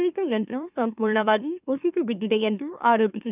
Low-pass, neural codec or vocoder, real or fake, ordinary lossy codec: 3.6 kHz; autoencoder, 44.1 kHz, a latent of 192 numbers a frame, MeloTTS; fake; none